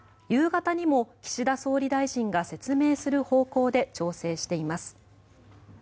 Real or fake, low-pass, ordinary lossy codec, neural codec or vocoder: real; none; none; none